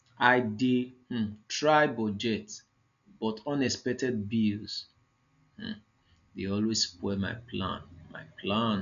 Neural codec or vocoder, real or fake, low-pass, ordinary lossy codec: none; real; 7.2 kHz; none